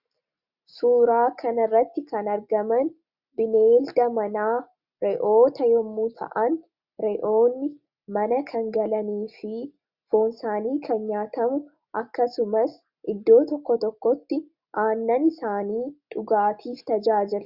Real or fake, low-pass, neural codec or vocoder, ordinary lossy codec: real; 5.4 kHz; none; Opus, 64 kbps